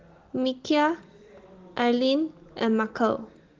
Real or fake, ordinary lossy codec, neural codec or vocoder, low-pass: real; Opus, 16 kbps; none; 7.2 kHz